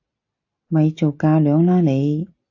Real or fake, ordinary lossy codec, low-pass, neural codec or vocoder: real; AAC, 48 kbps; 7.2 kHz; none